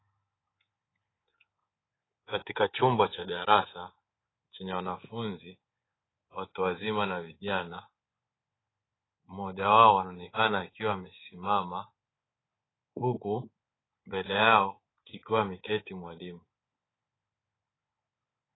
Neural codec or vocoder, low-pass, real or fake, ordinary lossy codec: codec, 24 kHz, 3.1 kbps, DualCodec; 7.2 kHz; fake; AAC, 16 kbps